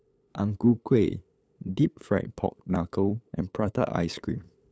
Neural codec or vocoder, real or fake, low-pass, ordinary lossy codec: codec, 16 kHz, 8 kbps, FunCodec, trained on LibriTTS, 25 frames a second; fake; none; none